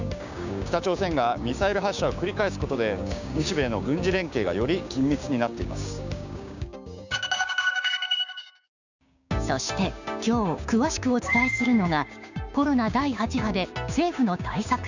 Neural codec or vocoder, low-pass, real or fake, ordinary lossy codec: codec, 16 kHz, 6 kbps, DAC; 7.2 kHz; fake; none